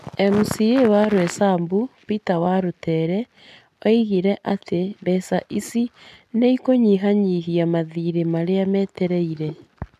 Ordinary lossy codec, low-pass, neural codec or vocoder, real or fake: none; 14.4 kHz; none; real